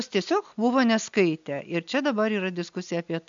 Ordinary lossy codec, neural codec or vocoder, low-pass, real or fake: MP3, 64 kbps; none; 7.2 kHz; real